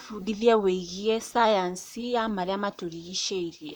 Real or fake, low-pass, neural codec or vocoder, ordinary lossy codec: fake; none; vocoder, 44.1 kHz, 128 mel bands, Pupu-Vocoder; none